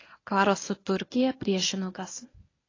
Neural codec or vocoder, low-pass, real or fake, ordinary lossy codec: codec, 24 kHz, 0.9 kbps, WavTokenizer, medium speech release version 1; 7.2 kHz; fake; AAC, 32 kbps